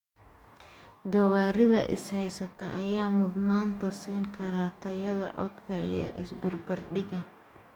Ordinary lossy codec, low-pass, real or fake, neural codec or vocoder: MP3, 96 kbps; 19.8 kHz; fake; codec, 44.1 kHz, 2.6 kbps, DAC